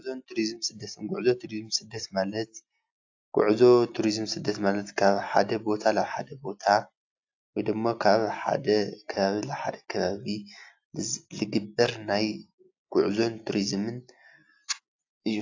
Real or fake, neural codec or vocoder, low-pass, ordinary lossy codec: real; none; 7.2 kHz; AAC, 48 kbps